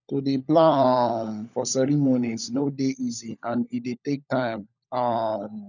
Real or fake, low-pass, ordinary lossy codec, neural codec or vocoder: fake; 7.2 kHz; none; codec, 16 kHz, 16 kbps, FunCodec, trained on LibriTTS, 50 frames a second